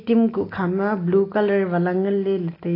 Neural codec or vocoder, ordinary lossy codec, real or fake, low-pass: none; AAC, 24 kbps; real; 5.4 kHz